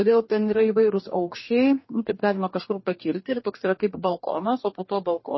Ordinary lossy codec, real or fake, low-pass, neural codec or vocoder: MP3, 24 kbps; fake; 7.2 kHz; codec, 44.1 kHz, 2.6 kbps, DAC